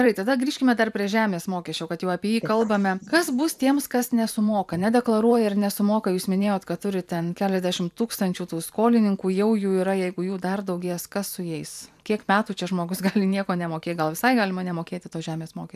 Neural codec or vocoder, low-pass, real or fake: vocoder, 44.1 kHz, 128 mel bands every 512 samples, BigVGAN v2; 14.4 kHz; fake